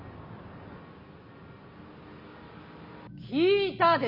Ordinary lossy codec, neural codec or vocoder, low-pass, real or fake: none; none; 5.4 kHz; real